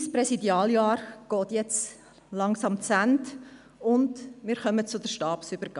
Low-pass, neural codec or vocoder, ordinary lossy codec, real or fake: 10.8 kHz; none; none; real